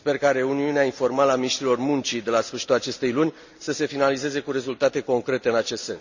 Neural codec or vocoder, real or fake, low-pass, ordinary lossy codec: none; real; 7.2 kHz; none